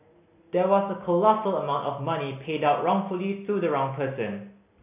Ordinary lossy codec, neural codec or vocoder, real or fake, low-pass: AAC, 32 kbps; none; real; 3.6 kHz